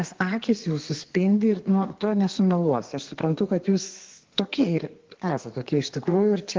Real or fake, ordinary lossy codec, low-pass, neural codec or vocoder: fake; Opus, 16 kbps; 7.2 kHz; codec, 32 kHz, 1.9 kbps, SNAC